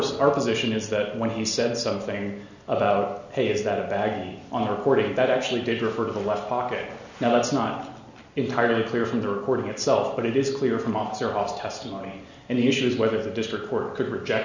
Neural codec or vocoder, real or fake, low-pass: none; real; 7.2 kHz